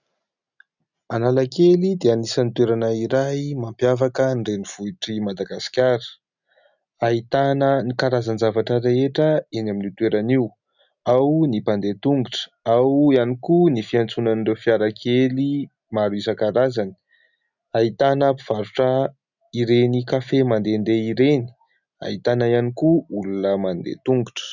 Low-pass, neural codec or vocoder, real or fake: 7.2 kHz; none; real